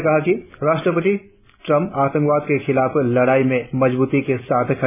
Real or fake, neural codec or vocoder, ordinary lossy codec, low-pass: real; none; none; 3.6 kHz